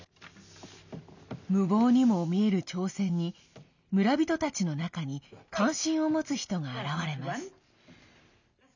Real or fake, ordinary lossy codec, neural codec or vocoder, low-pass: real; none; none; 7.2 kHz